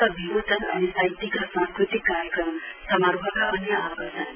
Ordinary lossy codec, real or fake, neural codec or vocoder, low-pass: none; real; none; 3.6 kHz